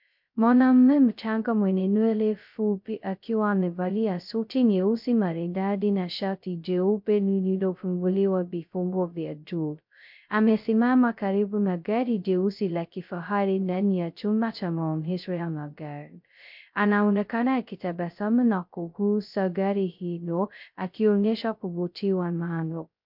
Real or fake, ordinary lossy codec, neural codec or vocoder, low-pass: fake; MP3, 48 kbps; codec, 16 kHz, 0.2 kbps, FocalCodec; 5.4 kHz